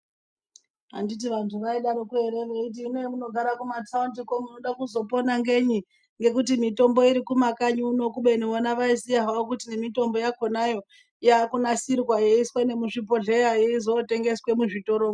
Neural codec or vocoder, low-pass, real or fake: none; 9.9 kHz; real